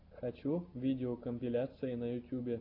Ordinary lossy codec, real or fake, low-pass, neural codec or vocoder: AAC, 32 kbps; real; 5.4 kHz; none